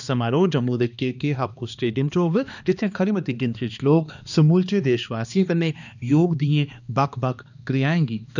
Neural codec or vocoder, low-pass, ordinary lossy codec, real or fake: codec, 16 kHz, 2 kbps, X-Codec, HuBERT features, trained on balanced general audio; 7.2 kHz; none; fake